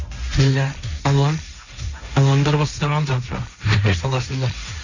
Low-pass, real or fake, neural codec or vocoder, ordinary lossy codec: 7.2 kHz; fake; codec, 16 kHz, 1.1 kbps, Voila-Tokenizer; none